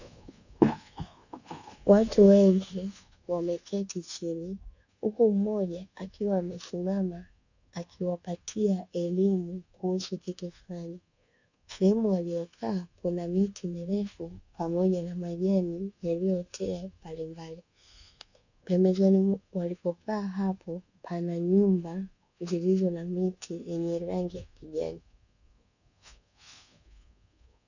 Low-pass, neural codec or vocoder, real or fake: 7.2 kHz; codec, 24 kHz, 1.2 kbps, DualCodec; fake